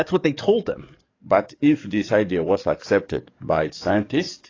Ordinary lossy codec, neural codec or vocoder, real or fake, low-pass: AAC, 32 kbps; codec, 44.1 kHz, 7.8 kbps, DAC; fake; 7.2 kHz